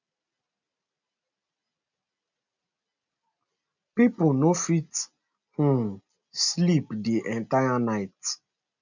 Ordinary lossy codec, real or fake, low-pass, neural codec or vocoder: none; real; 7.2 kHz; none